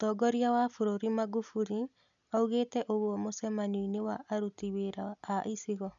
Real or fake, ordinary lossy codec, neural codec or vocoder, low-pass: real; none; none; 7.2 kHz